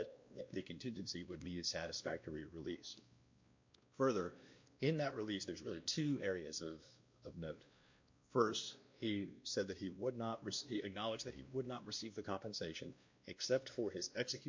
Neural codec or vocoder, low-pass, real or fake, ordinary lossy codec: codec, 16 kHz, 1 kbps, X-Codec, WavLM features, trained on Multilingual LibriSpeech; 7.2 kHz; fake; MP3, 48 kbps